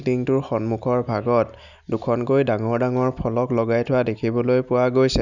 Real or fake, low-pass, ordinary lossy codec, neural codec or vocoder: real; 7.2 kHz; none; none